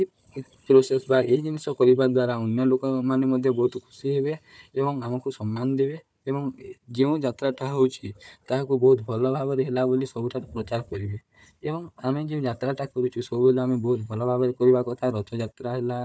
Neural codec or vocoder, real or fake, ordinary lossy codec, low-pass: codec, 16 kHz, 4 kbps, FunCodec, trained on Chinese and English, 50 frames a second; fake; none; none